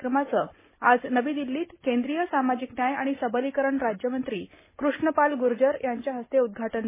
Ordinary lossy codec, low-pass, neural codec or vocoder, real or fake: MP3, 16 kbps; 3.6 kHz; none; real